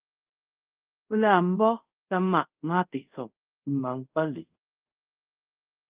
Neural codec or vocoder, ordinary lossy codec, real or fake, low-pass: codec, 24 kHz, 0.5 kbps, DualCodec; Opus, 32 kbps; fake; 3.6 kHz